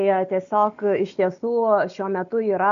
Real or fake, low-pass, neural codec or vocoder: real; 7.2 kHz; none